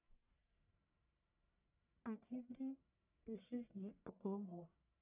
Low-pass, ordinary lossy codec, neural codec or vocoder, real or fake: 3.6 kHz; none; codec, 44.1 kHz, 1.7 kbps, Pupu-Codec; fake